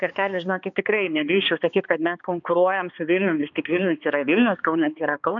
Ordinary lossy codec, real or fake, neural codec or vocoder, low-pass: AAC, 64 kbps; fake; codec, 16 kHz, 2 kbps, X-Codec, HuBERT features, trained on balanced general audio; 7.2 kHz